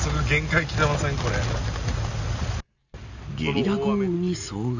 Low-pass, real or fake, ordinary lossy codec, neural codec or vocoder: 7.2 kHz; real; none; none